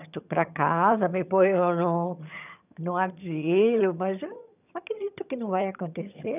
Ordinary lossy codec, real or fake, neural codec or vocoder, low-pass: none; fake; vocoder, 22.05 kHz, 80 mel bands, HiFi-GAN; 3.6 kHz